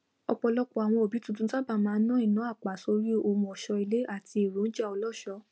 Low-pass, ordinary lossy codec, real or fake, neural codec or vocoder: none; none; real; none